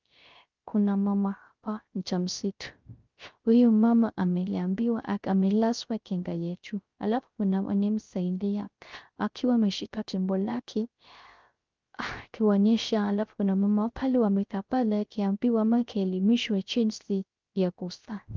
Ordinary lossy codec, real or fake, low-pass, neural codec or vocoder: Opus, 24 kbps; fake; 7.2 kHz; codec, 16 kHz, 0.3 kbps, FocalCodec